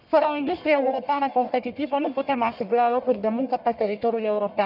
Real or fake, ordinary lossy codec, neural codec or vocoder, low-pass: fake; none; codec, 44.1 kHz, 1.7 kbps, Pupu-Codec; 5.4 kHz